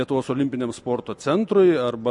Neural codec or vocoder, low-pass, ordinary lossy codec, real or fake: none; 10.8 kHz; MP3, 48 kbps; real